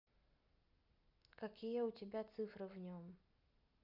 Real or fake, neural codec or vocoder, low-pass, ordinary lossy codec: real; none; 5.4 kHz; none